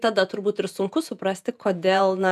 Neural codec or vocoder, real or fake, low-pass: vocoder, 48 kHz, 128 mel bands, Vocos; fake; 14.4 kHz